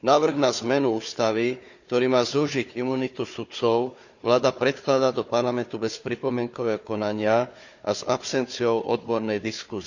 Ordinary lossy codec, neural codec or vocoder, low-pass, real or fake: none; codec, 16 kHz, 4 kbps, FunCodec, trained on Chinese and English, 50 frames a second; 7.2 kHz; fake